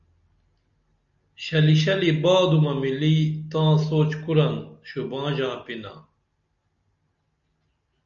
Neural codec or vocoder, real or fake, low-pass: none; real; 7.2 kHz